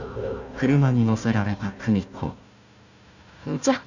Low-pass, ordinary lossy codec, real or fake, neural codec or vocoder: 7.2 kHz; none; fake; codec, 16 kHz, 1 kbps, FunCodec, trained on Chinese and English, 50 frames a second